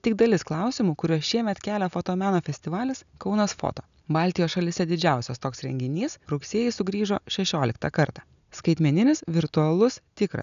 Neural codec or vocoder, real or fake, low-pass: none; real; 7.2 kHz